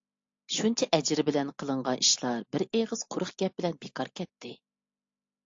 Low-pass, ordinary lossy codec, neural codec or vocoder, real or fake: 7.2 kHz; AAC, 48 kbps; none; real